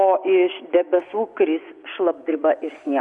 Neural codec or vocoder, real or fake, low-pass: vocoder, 24 kHz, 100 mel bands, Vocos; fake; 10.8 kHz